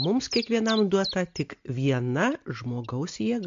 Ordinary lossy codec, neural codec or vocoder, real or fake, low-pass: MP3, 48 kbps; none; real; 7.2 kHz